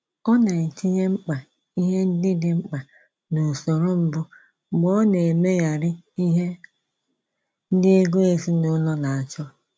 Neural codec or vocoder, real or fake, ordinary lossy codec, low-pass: none; real; none; none